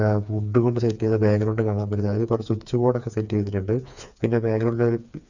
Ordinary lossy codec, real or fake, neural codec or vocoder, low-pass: none; fake; codec, 16 kHz, 4 kbps, FreqCodec, smaller model; 7.2 kHz